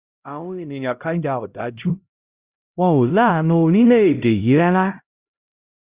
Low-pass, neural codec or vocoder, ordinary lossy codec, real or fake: 3.6 kHz; codec, 16 kHz, 0.5 kbps, X-Codec, HuBERT features, trained on LibriSpeech; Opus, 64 kbps; fake